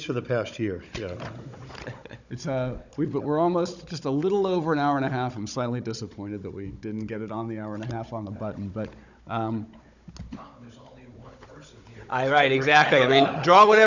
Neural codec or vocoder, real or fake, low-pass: codec, 16 kHz, 16 kbps, FunCodec, trained on Chinese and English, 50 frames a second; fake; 7.2 kHz